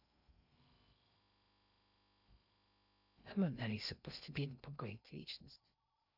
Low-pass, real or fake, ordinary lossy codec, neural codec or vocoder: 5.4 kHz; fake; none; codec, 16 kHz in and 24 kHz out, 0.6 kbps, FocalCodec, streaming, 2048 codes